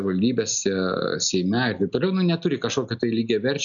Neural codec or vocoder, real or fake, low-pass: none; real; 7.2 kHz